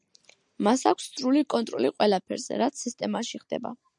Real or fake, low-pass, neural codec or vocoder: real; 10.8 kHz; none